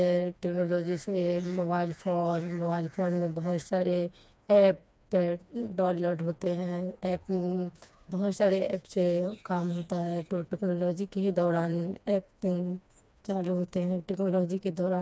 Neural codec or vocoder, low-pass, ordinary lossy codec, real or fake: codec, 16 kHz, 2 kbps, FreqCodec, smaller model; none; none; fake